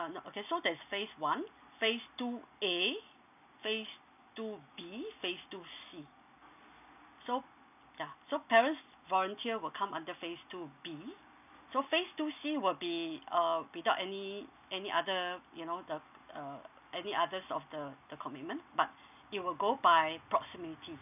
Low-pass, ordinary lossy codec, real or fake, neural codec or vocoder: 3.6 kHz; none; real; none